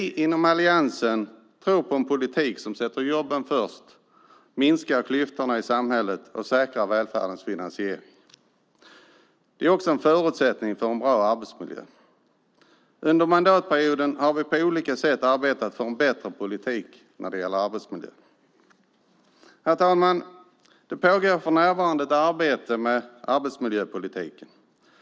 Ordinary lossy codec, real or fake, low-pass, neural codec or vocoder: none; real; none; none